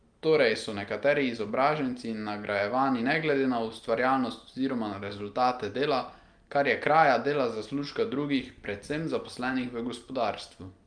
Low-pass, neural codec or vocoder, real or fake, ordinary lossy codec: 9.9 kHz; none; real; Opus, 32 kbps